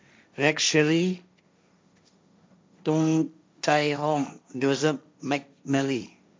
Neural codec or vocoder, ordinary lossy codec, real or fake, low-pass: codec, 16 kHz, 1.1 kbps, Voila-Tokenizer; none; fake; none